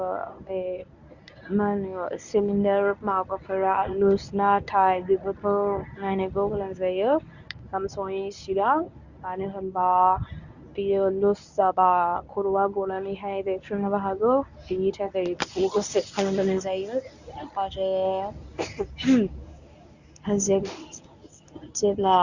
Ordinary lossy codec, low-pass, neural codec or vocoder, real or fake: none; 7.2 kHz; codec, 24 kHz, 0.9 kbps, WavTokenizer, medium speech release version 1; fake